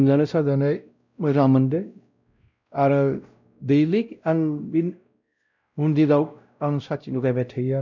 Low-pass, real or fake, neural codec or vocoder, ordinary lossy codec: 7.2 kHz; fake; codec, 16 kHz, 0.5 kbps, X-Codec, WavLM features, trained on Multilingual LibriSpeech; none